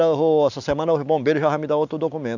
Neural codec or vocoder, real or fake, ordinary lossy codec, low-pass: none; real; none; 7.2 kHz